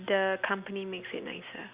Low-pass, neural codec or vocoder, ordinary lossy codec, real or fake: 3.6 kHz; none; Opus, 64 kbps; real